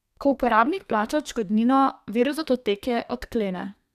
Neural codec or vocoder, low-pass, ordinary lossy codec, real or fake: codec, 32 kHz, 1.9 kbps, SNAC; 14.4 kHz; none; fake